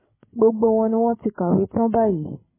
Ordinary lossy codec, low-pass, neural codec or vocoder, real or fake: MP3, 16 kbps; 3.6 kHz; codec, 16 kHz, 8 kbps, FreqCodec, larger model; fake